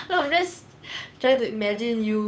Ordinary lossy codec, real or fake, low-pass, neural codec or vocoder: none; fake; none; codec, 16 kHz, 8 kbps, FunCodec, trained on Chinese and English, 25 frames a second